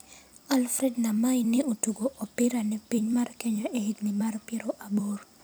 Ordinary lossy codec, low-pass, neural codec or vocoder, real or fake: none; none; vocoder, 44.1 kHz, 128 mel bands every 256 samples, BigVGAN v2; fake